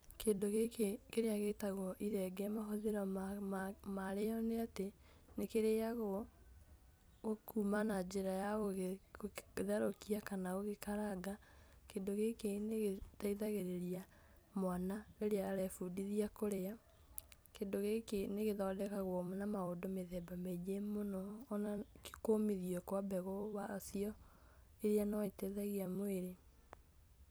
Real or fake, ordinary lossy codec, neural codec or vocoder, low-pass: fake; none; vocoder, 44.1 kHz, 128 mel bands every 256 samples, BigVGAN v2; none